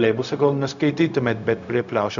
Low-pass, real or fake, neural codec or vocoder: 7.2 kHz; fake; codec, 16 kHz, 0.4 kbps, LongCat-Audio-Codec